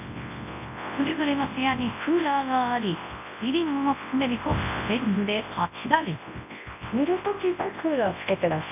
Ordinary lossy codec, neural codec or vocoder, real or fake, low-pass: none; codec, 24 kHz, 0.9 kbps, WavTokenizer, large speech release; fake; 3.6 kHz